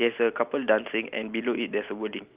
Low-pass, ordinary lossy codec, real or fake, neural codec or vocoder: 3.6 kHz; Opus, 24 kbps; real; none